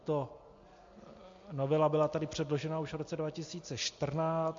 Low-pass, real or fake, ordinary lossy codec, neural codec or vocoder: 7.2 kHz; real; MP3, 48 kbps; none